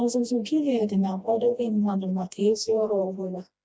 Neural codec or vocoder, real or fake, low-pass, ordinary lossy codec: codec, 16 kHz, 1 kbps, FreqCodec, smaller model; fake; none; none